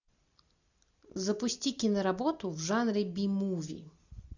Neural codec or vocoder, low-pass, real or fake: none; 7.2 kHz; real